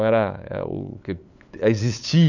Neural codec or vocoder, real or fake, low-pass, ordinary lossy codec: codec, 16 kHz, 8 kbps, FunCodec, trained on LibriTTS, 25 frames a second; fake; 7.2 kHz; none